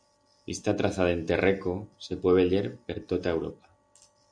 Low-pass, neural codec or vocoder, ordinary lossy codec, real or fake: 9.9 kHz; none; Opus, 64 kbps; real